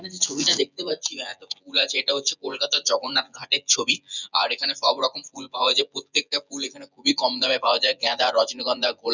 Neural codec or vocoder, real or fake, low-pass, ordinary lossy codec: vocoder, 24 kHz, 100 mel bands, Vocos; fake; 7.2 kHz; none